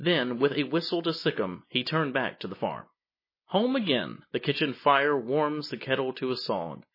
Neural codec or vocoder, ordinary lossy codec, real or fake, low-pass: none; MP3, 24 kbps; real; 5.4 kHz